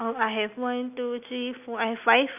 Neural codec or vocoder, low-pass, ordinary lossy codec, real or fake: none; 3.6 kHz; none; real